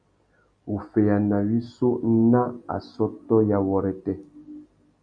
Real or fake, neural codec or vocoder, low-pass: real; none; 9.9 kHz